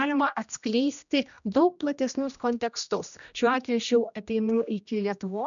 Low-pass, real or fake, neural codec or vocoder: 7.2 kHz; fake; codec, 16 kHz, 1 kbps, X-Codec, HuBERT features, trained on general audio